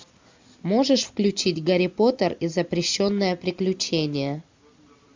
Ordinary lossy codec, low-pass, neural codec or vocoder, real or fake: MP3, 64 kbps; 7.2 kHz; none; real